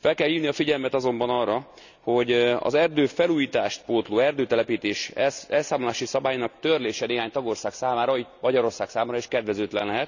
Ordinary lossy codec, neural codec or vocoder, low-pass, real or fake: none; none; 7.2 kHz; real